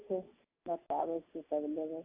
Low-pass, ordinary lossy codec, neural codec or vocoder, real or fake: 3.6 kHz; AAC, 32 kbps; none; real